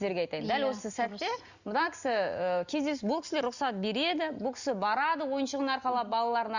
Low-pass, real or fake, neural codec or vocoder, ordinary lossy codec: 7.2 kHz; real; none; Opus, 64 kbps